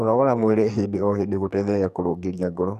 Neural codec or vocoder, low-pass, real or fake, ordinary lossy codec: codec, 44.1 kHz, 2.6 kbps, SNAC; 14.4 kHz; fake; none